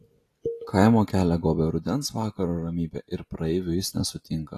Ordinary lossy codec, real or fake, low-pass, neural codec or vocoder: AAC, 48 kbps; real; 14.4 kHz; none